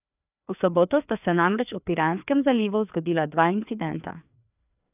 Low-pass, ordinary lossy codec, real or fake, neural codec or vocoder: 3.6 kHz; none; fake; codec, 16 kHz, 2 kbps, FreqCodec, larger model